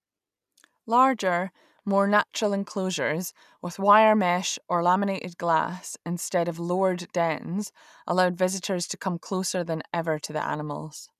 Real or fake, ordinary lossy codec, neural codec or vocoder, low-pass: real; none; none; 14.4 kHz